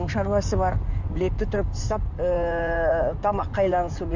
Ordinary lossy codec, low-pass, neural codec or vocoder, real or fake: none; 7.2 kHz; codec, 16 kHz in and 24 kHz out, 2.2 kbps, FireRedTTS-2 codec; fake